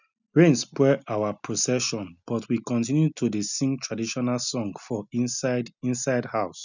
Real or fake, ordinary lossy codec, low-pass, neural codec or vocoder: real; none; 7.2 kHz; none